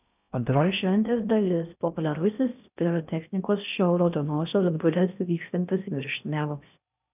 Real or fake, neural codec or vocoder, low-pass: fake; codec, 16 kHz in and 24 kHz out, 0.6 kbps, FocalCodec, streaming, 4096 codes; 3.6 kHz